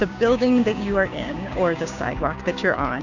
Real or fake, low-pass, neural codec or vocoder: fake; 7.2 kHz; codec, 16 kHz, 6 kbps, DAC